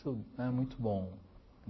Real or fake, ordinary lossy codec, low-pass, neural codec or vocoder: real; MP3, 24 kbps; 7.2 kHz; none